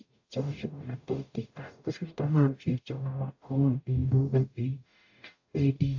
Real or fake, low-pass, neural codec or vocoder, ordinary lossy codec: fake; 7.2 kHz; codec, 44.1 kHz, 0.9 kbps, DAC; AAC, 48 kbps